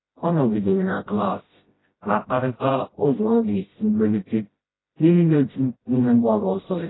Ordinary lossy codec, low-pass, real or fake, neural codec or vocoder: AAC, 16 kbps; 7.2 kHz; fake; codec, 16 kHz, 0.5 kbps, FreqCodec, smaller model